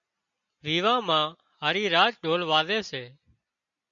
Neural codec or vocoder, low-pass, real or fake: none; 7.2 kHz; real